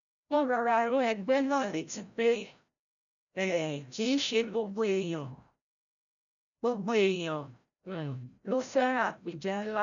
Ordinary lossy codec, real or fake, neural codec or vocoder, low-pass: none; fake; codec, 16 kHz, 0.5 kbps, FreqCodec, larger model; 7.2 kHz